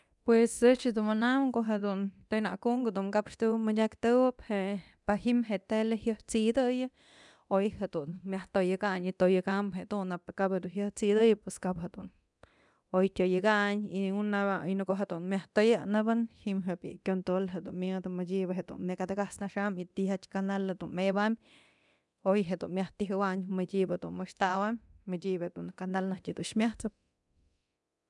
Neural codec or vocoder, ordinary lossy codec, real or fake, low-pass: codec, 24 kHz, 0.9 kbps, DualCodec; none; fake; 10.8 kHz